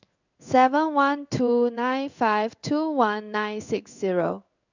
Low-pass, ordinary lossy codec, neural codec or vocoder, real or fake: 7.2 kHz; none; codec, 16 kHz in and 24 kHz out, 1 kbps, XY-Tokenizer; fake